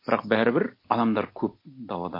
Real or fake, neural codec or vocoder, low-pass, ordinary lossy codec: real; none; 5.4 kHz; MP3, 32 kbps